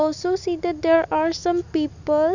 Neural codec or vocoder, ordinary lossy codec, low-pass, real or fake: none; none; 7.2 kHz; real